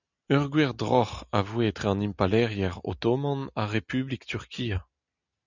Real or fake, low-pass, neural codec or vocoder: real; 7.2 kHz; none